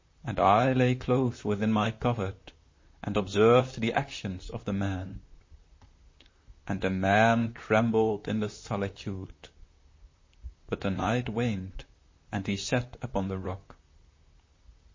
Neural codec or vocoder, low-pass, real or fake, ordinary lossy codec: vocoder, 44.1 kHz, 128 mel bands, Pupu-Vocoder; 7.2 kHz; fake; MP3, 32 kbps